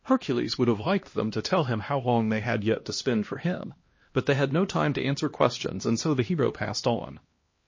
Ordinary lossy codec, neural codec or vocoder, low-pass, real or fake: MP3, 32 kbps; codec, 16 kHz, 1 kbps, X-Codec, HuBERT features, trained on LibriSpeech; 7.2 kHz; fake